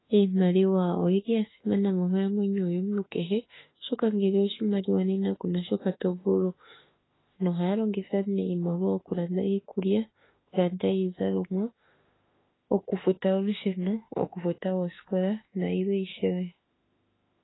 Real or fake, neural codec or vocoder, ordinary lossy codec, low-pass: fake; autoencoder, 48 kHz, 32 numbers a frame, DAC-VAE, trained on Japanese speech; AAC, 16 kbps; 7.2 kHz